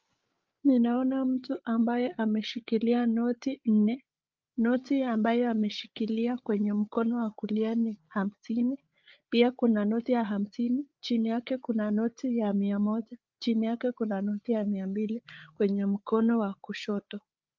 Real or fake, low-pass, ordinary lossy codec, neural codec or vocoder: fake; 7.2 kHz; Opus, 32 kbps; codec, 16 kHz, 8 kbps, FreqCodec, larger model